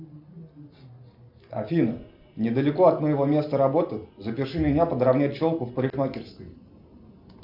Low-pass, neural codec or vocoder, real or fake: 5.4 kHz; none; real